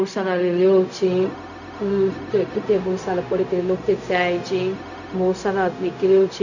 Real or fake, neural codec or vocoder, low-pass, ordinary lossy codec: fake; codec, 16 kHz, 0.4 kbps, LongCat-Audio-Codec; 7.2 kHz; none